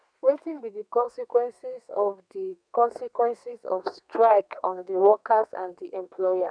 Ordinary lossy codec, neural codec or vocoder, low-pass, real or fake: none; codec, 32 kHz, 1.9 kbps, SNAC; 9.9 kHz; fake